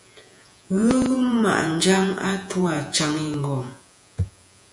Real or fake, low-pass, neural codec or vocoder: fake; 10.8 kHz; vocoder, 48 kHz, 128 mel bands, Vocos